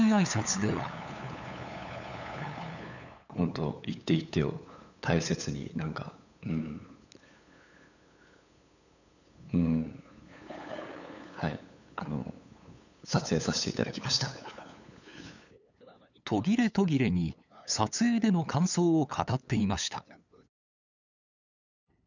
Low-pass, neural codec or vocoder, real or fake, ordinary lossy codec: 7.2 kHz; codec, 16 kHz, 8 kbps, FunCodec, trained on LibriTTS, 25 frames a second; fake; none